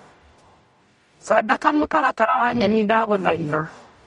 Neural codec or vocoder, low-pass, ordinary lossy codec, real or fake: codec, 44.1 kHz, 0.9 kbps, DAC; 19.8 kHz; MP3, 48 kbps; fake